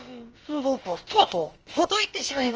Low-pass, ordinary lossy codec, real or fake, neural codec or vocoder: 7.2 kHz; Opus, 24 kbps; fake; codec, 16 kHz, about 1 kbps, DyCAST, with the encoder's durations